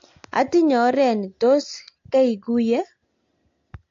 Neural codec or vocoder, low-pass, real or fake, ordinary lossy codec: none; 7.2 kHz; real; AAC, 48 kbps